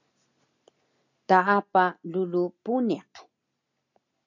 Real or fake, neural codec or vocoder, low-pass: real; none; 7.2 kHz